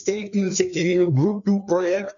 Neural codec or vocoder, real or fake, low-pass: codec, 16 kHz, 2 kbps, FreqCodec, larger model; fake; 7.2 kHz